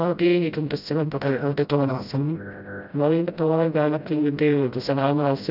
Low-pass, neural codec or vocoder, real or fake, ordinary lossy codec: 5.4 kHz; codec, 16 kHz, 0.5 kbps, FreqCodec, smaller model; fake; none